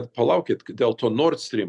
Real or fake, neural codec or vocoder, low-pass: real; none; 10.8 kHz